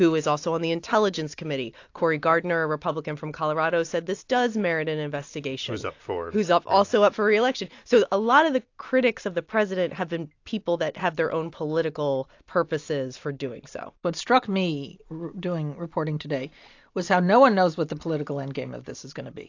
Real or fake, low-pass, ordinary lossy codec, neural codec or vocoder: real; 7.2 kHz; AAC, 48 kbps; none